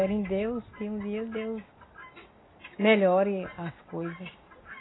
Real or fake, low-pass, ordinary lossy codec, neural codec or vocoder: real; 7.2 kHz; AAC, 16 kbps; none